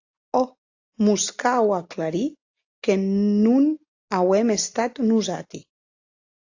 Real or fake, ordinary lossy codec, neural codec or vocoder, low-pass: real; AAC, 48 kbps; none; 7.2 kHz